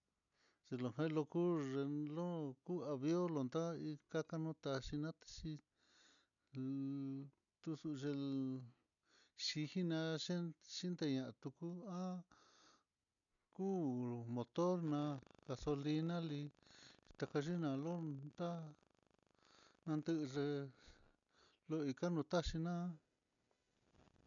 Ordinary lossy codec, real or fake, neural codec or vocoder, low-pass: none; real; none; 7.2 kHz